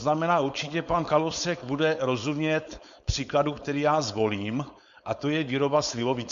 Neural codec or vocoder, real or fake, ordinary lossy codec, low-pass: codec, 16 kHz, 4.8 kbps, FACodec; fake; Opus, 64 kbps; 7.2 kHz